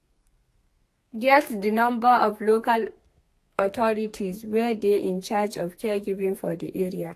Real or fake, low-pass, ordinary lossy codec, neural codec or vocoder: fake; 14.4 kHz; AAC, 64 kbps; codec, 32 kHz, 1.9 kbps, SNAC